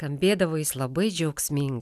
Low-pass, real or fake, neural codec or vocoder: 14.4 kHz; real; none